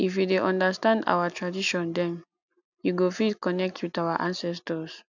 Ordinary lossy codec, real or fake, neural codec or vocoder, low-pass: none; real; none; 7.2 kHz